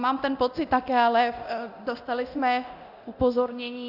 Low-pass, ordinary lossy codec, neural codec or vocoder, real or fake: 5.4 kHz; Opus, 64 kbps; codec, 24 kHz, 0.9 kbps, DualCodec; fake